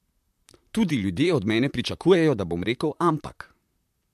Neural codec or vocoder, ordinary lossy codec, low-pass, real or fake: vocoder, 44.1 kHz, 128 mel bands, Pupu-Vocoder; MP3, 96 kbps; 14.4 kHz; fake